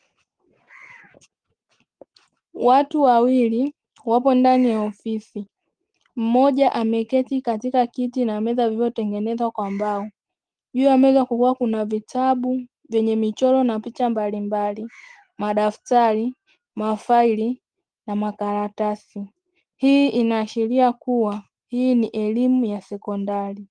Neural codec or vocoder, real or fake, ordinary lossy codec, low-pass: none; real; Opus, 24 kbps; 9.9 kHz